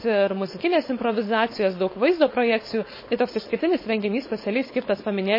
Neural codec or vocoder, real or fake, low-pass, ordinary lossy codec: codec, 16 kHz, 4.8 kbps, FACodec; fake; 5.4 kHz; MP3, 24 kbps